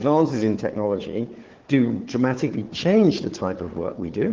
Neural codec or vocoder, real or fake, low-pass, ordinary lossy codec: codec, 16 kHz, 4 kbps, FunCodec, trained on Chinese and English, 50 frames a second; fake; 7.2 kHz; Opus, 16 kbps